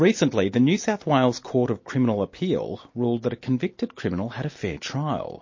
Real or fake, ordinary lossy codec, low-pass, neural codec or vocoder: real; MP3, 32 kbps; 7.2 kHz; none